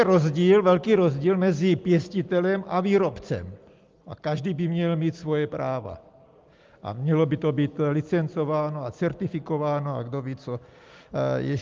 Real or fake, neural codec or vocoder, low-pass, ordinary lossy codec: real; none; 7.2 kHz; Opus, 24 kbps